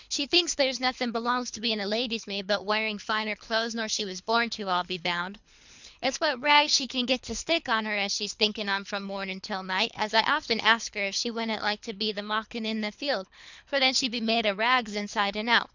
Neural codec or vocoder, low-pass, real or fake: codec, 24 kHz, 3 kbps, HILCodec; 7.2 kHz; fake